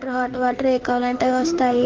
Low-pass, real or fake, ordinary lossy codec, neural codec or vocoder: 7.2 kHz; fake; Opus, 16 kbps; autoencoder, 48 kHz, 32 numbers a frame, DAC-VAE, trained on Japanese speech